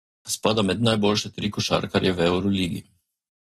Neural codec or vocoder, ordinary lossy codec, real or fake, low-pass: none; AAC, 32 kbps; real; 19.8 kHz